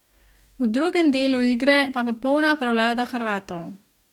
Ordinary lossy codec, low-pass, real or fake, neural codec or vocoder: none; 19.8 kHz; fake; codec, 44.1 kHz, 2.6 kbps, DAC